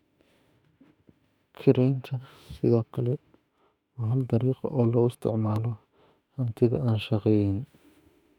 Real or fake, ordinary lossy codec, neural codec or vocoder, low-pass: fake; none; autoencoder, 48 kHz, 32 numbers a frame, DAC-VAE, trained on Japanese speech; 19.8 kHz